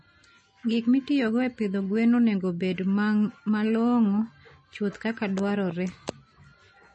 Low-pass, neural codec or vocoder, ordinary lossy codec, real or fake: 10.8 kHz; none; MP3, 32 kbps; real